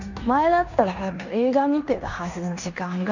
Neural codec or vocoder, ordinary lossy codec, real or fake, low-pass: codec, 16 kHz in and 24 kHz out, 0.9 kbps, LongCat-Audio-Codec, fine tuned four codebook decoder; none; fake; 7.2 kHz